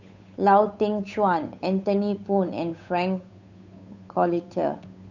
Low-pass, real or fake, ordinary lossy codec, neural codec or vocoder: 7.2 kHz; fake; none; codec, 16 kHz, 8 kbps, FunCodec, trained on Chinese and English, 25 frames a second